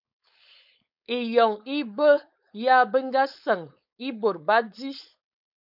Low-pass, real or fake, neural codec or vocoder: 5.4 kHz; fake; codec, 16 kHz, 4.8 kbps, FACodec